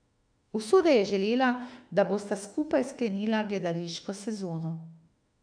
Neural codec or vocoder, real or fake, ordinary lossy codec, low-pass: autoencoder, 48 kHz, 32 numbers a frame, DAC-VAE, trained on Japanese speech; fake; AAC, 64 kbps; 9.9 kHz